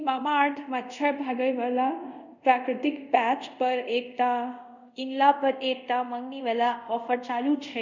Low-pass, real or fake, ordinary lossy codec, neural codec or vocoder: 7.2 kHz; fake; none; codec, 24 kHz, 0.5 kbps, DualCodec